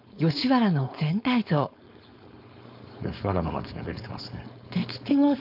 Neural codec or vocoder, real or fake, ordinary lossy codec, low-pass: codec, 16 kHz, 4.8 kbps, FACodec; fake; none; 5.4 kHz